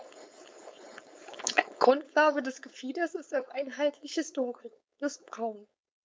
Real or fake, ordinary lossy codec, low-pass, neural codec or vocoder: fake; none; none; codec, 16 kHz, 4.8 kbps, FACodec